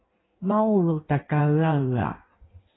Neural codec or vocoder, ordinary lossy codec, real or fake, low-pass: codec, 16 kHz in and 24 kHz out, 1.1 kbps, FireRedTTS-2 codec; AAC, 16 kbps; fake; 7.2 kHz